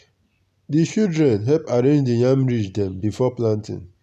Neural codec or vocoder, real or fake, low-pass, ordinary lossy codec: none; real; 14.4 kHz; none